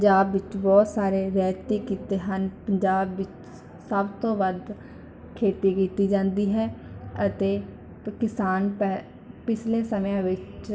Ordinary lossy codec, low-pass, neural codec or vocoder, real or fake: none; none; none; real